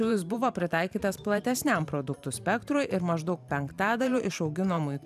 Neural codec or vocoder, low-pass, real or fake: vocoder, 44.1 kHz, 128 mel bands every 512 samples, BigVGAN v2; 14.4 kHz; fake